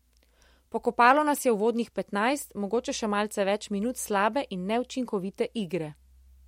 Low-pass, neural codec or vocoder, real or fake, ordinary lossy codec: 19.8 kHz; none; real; MP3, 64 kbps